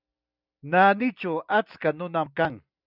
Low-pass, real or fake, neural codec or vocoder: 5.4 kHz; real; none